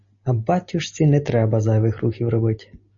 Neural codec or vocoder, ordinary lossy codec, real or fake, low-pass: none; MP3, 32 kbps; real; 7.2 kHz